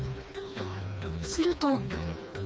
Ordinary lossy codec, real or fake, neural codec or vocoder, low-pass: none; fake; codec, 16 kHz, 2 kbps, FreqCodec, smaller model; none